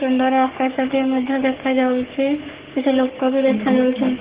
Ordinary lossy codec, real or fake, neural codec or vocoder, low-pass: Opus, 16 kbps; fake; codec, 44.1 kHz, 3.4 kbps, Pupu-Codec; 3.6 kHz